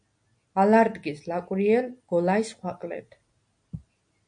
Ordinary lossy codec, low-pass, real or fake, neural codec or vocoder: AAC, 64 kbps; 9.9 kHz; real; none